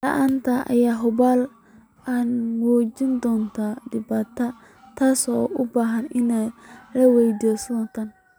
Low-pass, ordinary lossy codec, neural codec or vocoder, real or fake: none; none; none; real